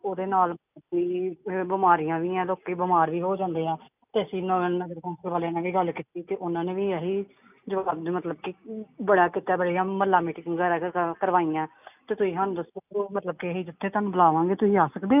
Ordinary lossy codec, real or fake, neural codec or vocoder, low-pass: none; real; none; 3.6 kHz